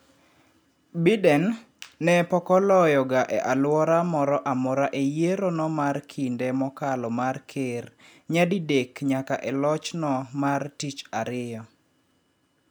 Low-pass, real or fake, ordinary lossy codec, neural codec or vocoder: none; real; none; none